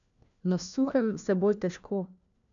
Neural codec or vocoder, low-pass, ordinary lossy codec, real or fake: codec, 16 kHz, 1 kbps, FunCodec, trained on LibriTTS, 50 frames a second; 7.2 kHz; none; fake